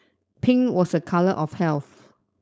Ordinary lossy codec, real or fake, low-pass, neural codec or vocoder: none; fake; none; codec, 16 kHz, 4.8 kbps, FACodec